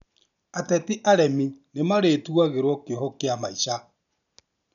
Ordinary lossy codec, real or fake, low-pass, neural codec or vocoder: none; real; 7.2 kHz; none